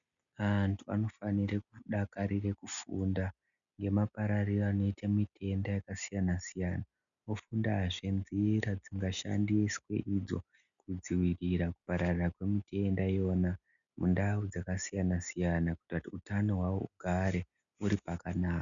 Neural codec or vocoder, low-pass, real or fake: none; 7.2 kHz; real